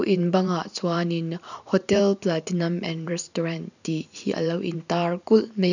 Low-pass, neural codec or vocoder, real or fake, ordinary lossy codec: 7.2 kHz; vocoder, 44.1 kHz, 128 mel bands every 512 samples, BigVGAN v2; fake; none